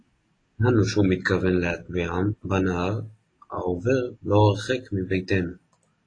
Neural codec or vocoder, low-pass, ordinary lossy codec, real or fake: none; 9.9 kHz; AAC, 32 kbps; real